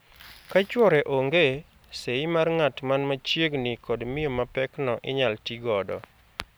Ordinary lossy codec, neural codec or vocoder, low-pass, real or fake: none; none; none; real